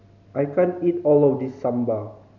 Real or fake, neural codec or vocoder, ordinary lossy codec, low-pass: real; none; none; 7.2 kHz